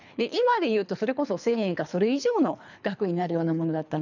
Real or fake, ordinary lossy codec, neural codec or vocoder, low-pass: fake; none; codec, 24 kHz, 3 kbps, HILCodec; 7.2 kHz